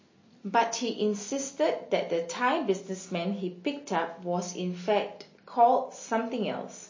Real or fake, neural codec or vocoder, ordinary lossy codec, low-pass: real; none; MP3, 32 kbps; 7.2 kHz